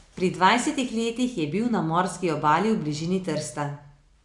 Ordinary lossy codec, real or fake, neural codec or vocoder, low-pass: none; real; none; 10.8 kHz